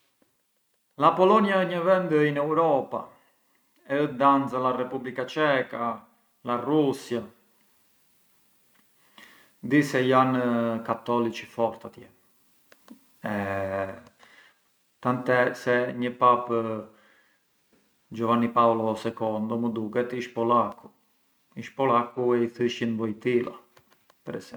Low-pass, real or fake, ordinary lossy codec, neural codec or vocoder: none; real; none; none